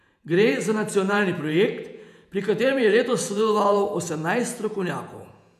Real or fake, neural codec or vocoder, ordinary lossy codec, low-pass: fake; vocoder, 48 kHz, 128 mel bands, Vocos; none; 14.4 kHz